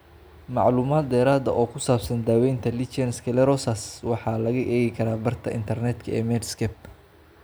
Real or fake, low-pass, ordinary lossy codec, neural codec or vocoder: real; none; none; none